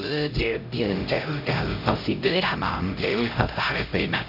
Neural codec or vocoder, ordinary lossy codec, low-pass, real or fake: codec, 16 kHz, 0.5 kbps, X-Codec, HuBERT features, trained on LibriSpeech; none; 5.4 kHz; fake